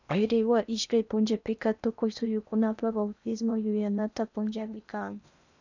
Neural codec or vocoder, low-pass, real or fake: codec, 16 kHz in and 24 kHz out, 0.8 kbps, FocalCodec, streaming, 65536 codes; 7.2 kHz; fake